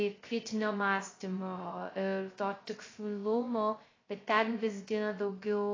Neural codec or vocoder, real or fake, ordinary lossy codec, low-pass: codec, 16 kHz, 0.2 kbps, FocalCodec; fake; AAC, 32 kbps; 7.2 kHz